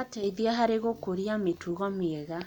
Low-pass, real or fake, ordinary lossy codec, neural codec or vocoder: 19.8 kHz; real; none; none